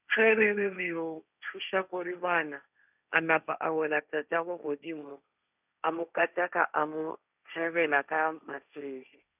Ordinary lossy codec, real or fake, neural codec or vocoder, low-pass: none; fake; codec, 16 kHz, 1.1 kbps, Voila-Tokenizer; 3.6 kHz